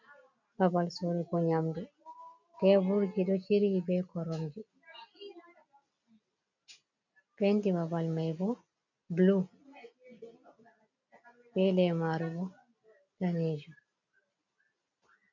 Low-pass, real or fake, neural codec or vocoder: 7.2 kHz; real; none